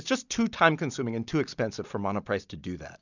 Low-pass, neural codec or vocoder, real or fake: 7.2 kHz; none; real